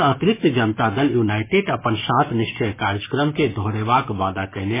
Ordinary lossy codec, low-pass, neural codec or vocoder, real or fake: MP3, 16 kbps; 3.6 kHz; codec, 44.1 kHz, 7.8 kbps, DAC; fake